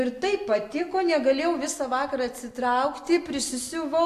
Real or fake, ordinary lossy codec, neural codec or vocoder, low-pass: real; AAC, 64 kbps; none; 14.4 kHz